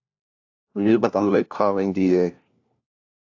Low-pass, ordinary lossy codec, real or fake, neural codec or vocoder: 7.2 kHz; AAC, 48 kbps; fake; codec, 16 kHz, 1 kbps, FunCodec, trained on LibriTTS, 50 frames a second